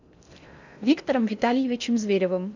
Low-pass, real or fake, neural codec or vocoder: 7.2 kHz; fake; codec, 16 kHz in and 24 kHz out, 0.6 kbps, FocalCodec, streaming, 2048 codes